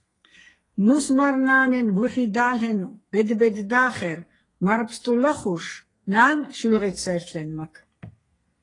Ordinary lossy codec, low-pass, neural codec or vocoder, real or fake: AAC, 32 kbps; 10.8 kHz; codec, 32 kHz, 1.9 kbps, SNAC; fake